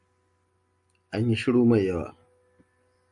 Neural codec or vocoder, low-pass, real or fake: none; 10.8 kHz; real